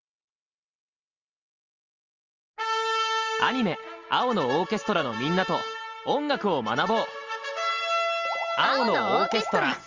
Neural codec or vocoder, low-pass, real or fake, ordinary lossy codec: none; 7.2 kHz; real; Opus, 32 kbps